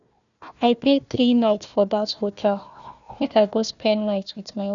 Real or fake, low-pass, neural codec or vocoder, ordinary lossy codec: fake; 7.2 kHz; codec, 16 kHz, 1 kbps, FunCodec, trained on Chinese and English, 50 frames a second; none